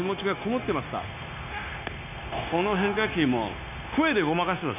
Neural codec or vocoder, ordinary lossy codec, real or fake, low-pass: codec, 16 kHz, 0.9 kbps, LongCat-Audio-Codec; none; fake; 3.6 kHz